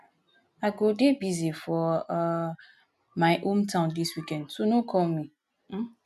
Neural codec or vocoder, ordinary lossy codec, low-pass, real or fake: none; none; 14.4 kHz; real